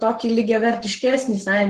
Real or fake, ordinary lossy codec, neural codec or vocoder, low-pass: fake; Opus, 16 kbps; codec, 44.1 kHz, 7.8 kbps, Pupu-Codec; 14.4 kHz